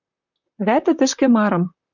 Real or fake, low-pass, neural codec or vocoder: fake; 7.2 kHz; vocoder, 44.1 kHz, 128 mel bands, Pupu-Vocoder